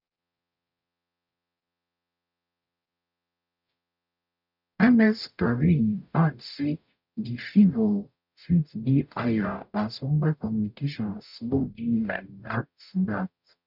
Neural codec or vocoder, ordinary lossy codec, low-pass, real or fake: codec, 44.1 kHz, 0.9 kbps, DAC; none; 5.4 kHz; fake